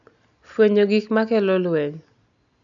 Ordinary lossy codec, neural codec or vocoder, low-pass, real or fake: none; none; 7.2 kHz; real